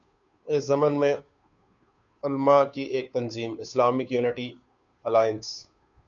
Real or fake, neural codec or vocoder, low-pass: fake; codec, 16 kHz, 2 kbps, FunCodec, trained on Chinese and English, 25 frames a second; 7.2 kHz